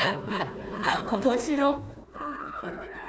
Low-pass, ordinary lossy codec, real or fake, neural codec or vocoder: none; none; fake; codec, 16 kHz, 1 kbps, FunCodec, trained on Chinese and English, 50 frames a second